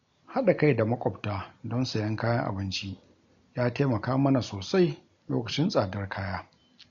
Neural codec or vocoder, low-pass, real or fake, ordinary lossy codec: none; 7.2 kHz; real; MP3, 48 kbps